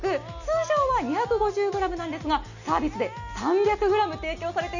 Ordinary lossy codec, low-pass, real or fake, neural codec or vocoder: none; 7.2 kHz; real; none